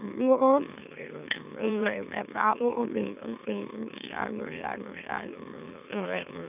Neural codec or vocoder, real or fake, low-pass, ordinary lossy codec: autoencoder, 44.1 kHz, a latent of 192 numbers a frame, MeloTTS; fake; 3.6 kHz; none